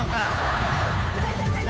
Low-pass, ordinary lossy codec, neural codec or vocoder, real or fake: none; none; codec, 16 kHz, 2 kbps, FunCodec, trained on Chinese and English, 25 frames a second; fake